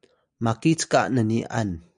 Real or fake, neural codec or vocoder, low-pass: real; none; 9.9 kHz